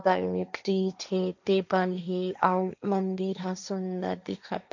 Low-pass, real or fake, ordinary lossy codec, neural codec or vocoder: 7.2 kHz; fake; none; codec, 16 kHz, 1.1 kbps, Voila-Tokenizer